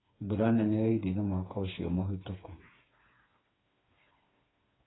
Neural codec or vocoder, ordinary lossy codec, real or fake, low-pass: codec, 16 kHz, 4 kbps, FreqCodec, smaller model; AAC, 16 kbps; fake; 7.2 kHz